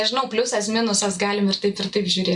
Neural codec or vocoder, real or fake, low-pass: none; real; 10.8 kHz